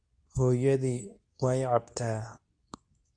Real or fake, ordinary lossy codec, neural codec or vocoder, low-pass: fake; AAC, 64 kbps; codec, 24 kHz, 0.9 kbps, WavTokenizer, medium speech release version 2; 9.9 kHz